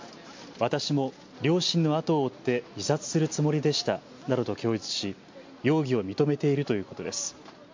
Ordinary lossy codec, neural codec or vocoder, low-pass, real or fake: MP3, 48 kbps; none; 7.2 kHz; real